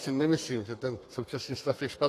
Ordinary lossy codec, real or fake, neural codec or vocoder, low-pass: AAC, 48 kbps; fake; codec, 32 kHz, 1.9 kbps, SNAC; 14.4 kHz